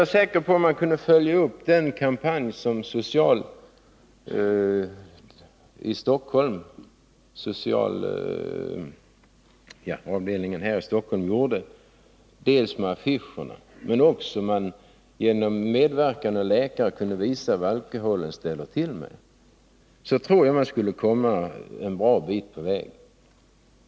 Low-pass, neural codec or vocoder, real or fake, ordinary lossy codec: none; none; real; none